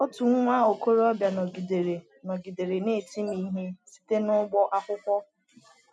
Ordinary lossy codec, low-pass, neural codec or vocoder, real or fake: none; none; none; real